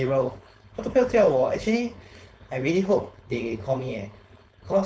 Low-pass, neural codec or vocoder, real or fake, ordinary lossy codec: none; codec, 16 kHz, 4.8 kbps, FACodec; fake; none